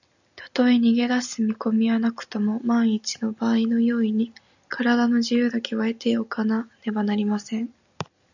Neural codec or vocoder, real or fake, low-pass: none; real; 7.2 kHz